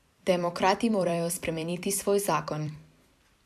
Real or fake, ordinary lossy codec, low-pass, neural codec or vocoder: fake; MP3, 96 kbps; 14.4 kHz; vocoder, 44.1 kHz, 128 mel bands every 256 samples, BigVGAN v2